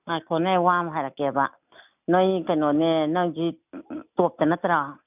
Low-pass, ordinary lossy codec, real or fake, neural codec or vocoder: 3.6 kHz; none; real; none